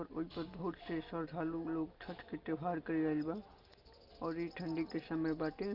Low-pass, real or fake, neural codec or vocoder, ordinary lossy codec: 5.4 kHz; real; none; Opus, 24 kbps